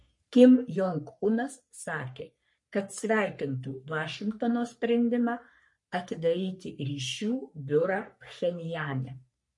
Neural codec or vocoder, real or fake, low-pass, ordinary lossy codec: codec, 44.1 kHz, 3.4 kbps, Pupu-Codec; fake; 10.8 kHz; MP3, 48 kbps